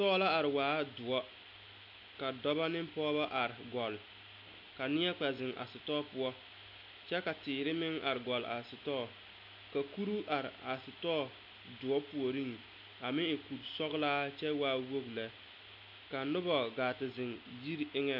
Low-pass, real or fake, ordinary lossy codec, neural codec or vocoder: 5.4 kHz; real; MP3, 48 kbps; none